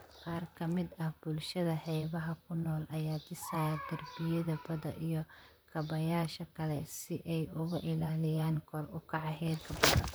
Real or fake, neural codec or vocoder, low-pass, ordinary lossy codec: fake; vocoder, 44.1 kHz, 128 mel bands, Pupu-Vocoder; none; none